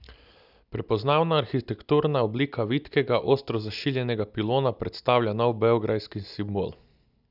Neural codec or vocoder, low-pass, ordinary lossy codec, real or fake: none; 5.4 kHz; none; real